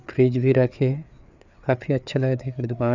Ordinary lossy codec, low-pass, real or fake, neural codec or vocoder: none; 7.2 kHz; fake; codec, 16 kHz, 4 kbps, FreqCodec, larger model